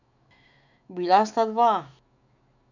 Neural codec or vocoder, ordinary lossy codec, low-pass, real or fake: autoencoder, 48 kHz, 128 numbers a frame, DAC-VAE, trained on Japanese speech; none; 7.2 kHz; fake